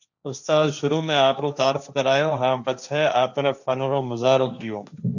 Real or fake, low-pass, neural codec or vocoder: fake; 7.2 kHz; codec, 16 kHz, 1.1 kbps, Voila-Tokenizer